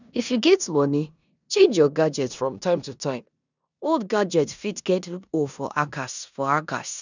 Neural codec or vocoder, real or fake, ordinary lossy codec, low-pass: codec, 16 kHz in and 24 kHz out, 0.9 kbps, LongCat-Audio-Codec, four codebook decoder; fake; none; 7.2 kHz